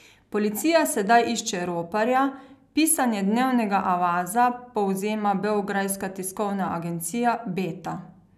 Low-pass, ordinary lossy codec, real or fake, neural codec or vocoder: 14.4 kHz; none; real; none